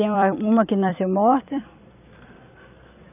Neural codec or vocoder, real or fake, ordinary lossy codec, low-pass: vocoder, 44.1 kHz, 128 mel bands every 256 samples, BigVGAN v2; fake; none; 3.6 kHz